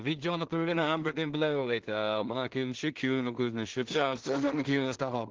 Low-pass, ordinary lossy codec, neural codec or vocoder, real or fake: 7.2 kHz; Opus, 16 kbps; codec, 16 kHz in and 24 kHz out, 0.4 kbps, LongCat-Audio-Codec, two codebook decoder; fake